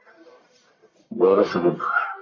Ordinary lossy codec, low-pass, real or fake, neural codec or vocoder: MP3, 48 kbps; 7.2 kHz; fake; codec, 44.1 kHz, 1.7 kbps, Pupu-Codec